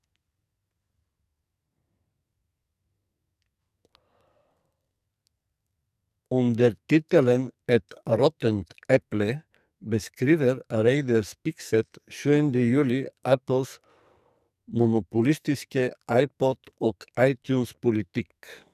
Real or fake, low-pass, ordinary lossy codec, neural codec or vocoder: fake; 14.4 kHz; none; codec, 44.1 kHz, 2.6 kbps, SNAC